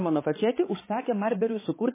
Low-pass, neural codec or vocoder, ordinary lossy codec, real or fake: 3.6 kHz; codec, 16 kHz, 4 kbps, X-Codec, HuBERT features, trained on balanced general audio; MP3, 16 kbps; fake